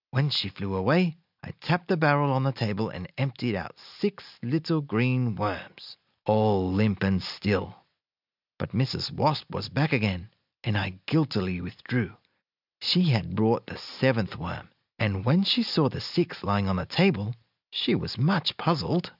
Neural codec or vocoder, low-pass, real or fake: none; 5.4 kHz; real